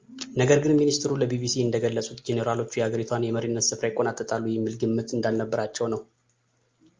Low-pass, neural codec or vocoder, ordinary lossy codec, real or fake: 7.2 kHz; none; Opus, 32 kbps; real